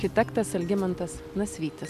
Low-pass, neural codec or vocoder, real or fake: 14.4 kHz; none; real